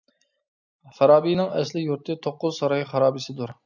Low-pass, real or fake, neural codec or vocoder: 7.2 kHz; real; none